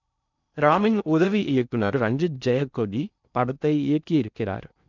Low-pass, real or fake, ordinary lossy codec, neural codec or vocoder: 7.2 kHz; fake; none; codec, 16 kHz in and 24 kHz out, 0.6 kbps, FocalCodec, streaming, 2048 codes